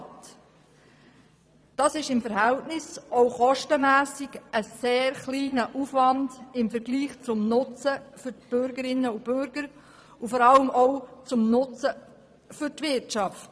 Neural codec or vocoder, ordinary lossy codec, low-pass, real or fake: vocoder, 22.05 kHz, 80 mel bands, Vocos; none; none; fake